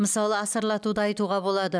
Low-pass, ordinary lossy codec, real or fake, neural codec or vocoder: none; none; real; none